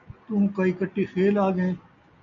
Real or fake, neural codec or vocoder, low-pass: real; none; 7.2 kHz